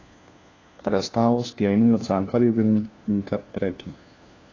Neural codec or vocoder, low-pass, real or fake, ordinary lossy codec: codec, 16 kHz, 1 kbps, FunCodec, trained on LibriTTS, 50 frames a second; 7.2 kHz; fake; AAC, 32 kbps